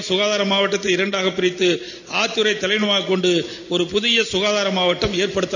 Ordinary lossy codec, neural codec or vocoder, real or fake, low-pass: none; none; real; 7.2 kHz